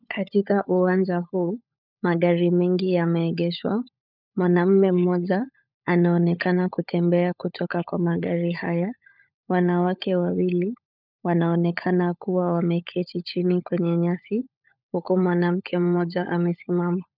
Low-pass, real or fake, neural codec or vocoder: 5.4 kHz; fake; codec, 16 kHz, 16 kbps, FunCodec, trained on LibriTTS, 50 frames a second